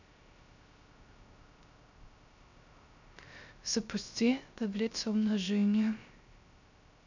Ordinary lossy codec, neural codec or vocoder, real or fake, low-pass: none; codec, 16 kHz, 0.3 kbps, FocalCodec; fake; 7.2 kHz